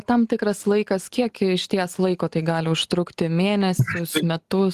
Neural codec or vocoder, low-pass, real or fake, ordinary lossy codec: none; 14.4 kHz; real; Opus, 24 kbps